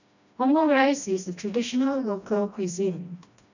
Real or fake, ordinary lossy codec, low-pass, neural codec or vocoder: fake; none; 7.2 kHz; codec, 16 kHz, 1 kbps, FreqCodec, smaller model